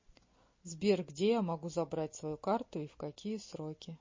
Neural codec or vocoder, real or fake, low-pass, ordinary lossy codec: none; real; 7.2 kHz; MP3, 32 kbps